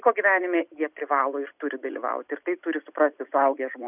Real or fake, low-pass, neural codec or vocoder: real; 3.6 kHz; none